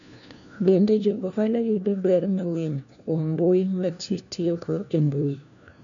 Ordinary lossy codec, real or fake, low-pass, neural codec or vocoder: none; fake; 7.2 kHz; codec, 16 kHz, 1 kbps, FunCodec, trained on LibriTTS, 50 frames a second